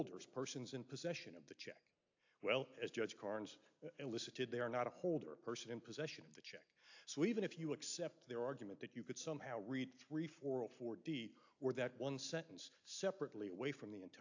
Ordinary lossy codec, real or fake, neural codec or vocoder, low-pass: AAC, 48 kbps; real; none; 7.2 kHz